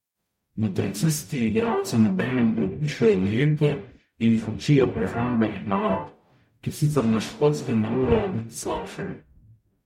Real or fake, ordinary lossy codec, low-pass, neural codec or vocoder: fake; MP3, 64 kbps; 19.8 kHz; codec, 44.1 kHz, 0.9 kbps, DAC